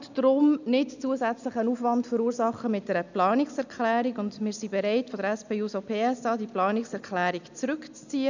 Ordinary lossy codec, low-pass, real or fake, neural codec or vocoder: none; 7.2 kHz; real; none